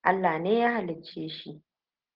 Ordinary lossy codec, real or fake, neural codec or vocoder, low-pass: Opus, 16 kbps; real; none; 5.4 kHz